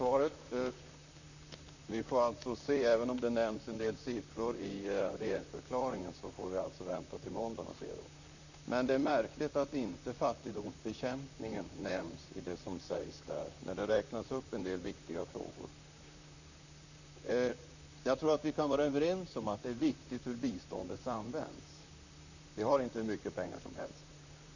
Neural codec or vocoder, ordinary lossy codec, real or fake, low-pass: vocoder, 44.1 kHz, 128 mel bands, Pupu-Vocoder; none; fake; 7.2 kHz